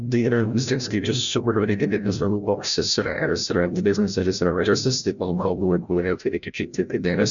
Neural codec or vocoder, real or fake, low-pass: codec, 16 kHz, 0.5 kbps, FreqCodec, larger model; fake; 7.2 kHz